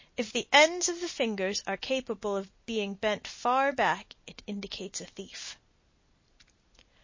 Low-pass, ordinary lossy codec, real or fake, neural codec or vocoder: 7.2 kHz; MP3, 32 kbps; real; none